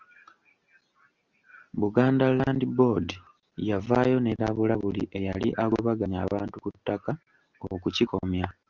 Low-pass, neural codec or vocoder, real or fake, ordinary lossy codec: 7.2 kHz; none; real; Opus, 32 kbps